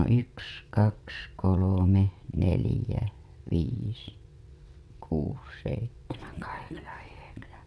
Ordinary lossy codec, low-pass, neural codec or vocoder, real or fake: none; none; vocoder, 22.05 kHz, 80 mel bands, WaveNeXt; fake